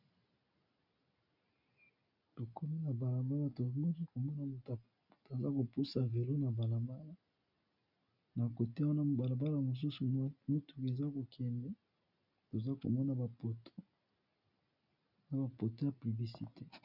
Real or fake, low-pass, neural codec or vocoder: real; 5.4 kHz; none